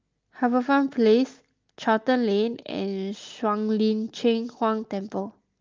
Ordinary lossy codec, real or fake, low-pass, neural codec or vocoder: Opus, 32 kbps; real; 7.2 kHz; none